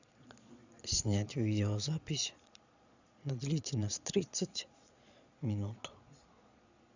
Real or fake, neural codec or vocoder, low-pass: fake; vocoder, 22.05 kHz, 80 mel bands, Vocos; 7.2 kHz